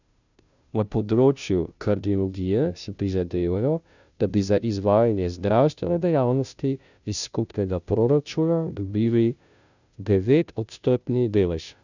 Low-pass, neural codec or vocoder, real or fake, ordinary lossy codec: 7.2 kHz; codec, 16 kHz, 0.5 kbps, FunCodec, trained on Chinese and English, 25 frames a second; fake; none